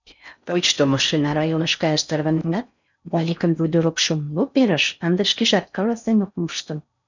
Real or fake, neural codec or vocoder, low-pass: fake; codec, 16 kHz in and 24 kHz out, 0.6 kbps, FocalCodec, streaming, 4096 codes; 7.2 kHz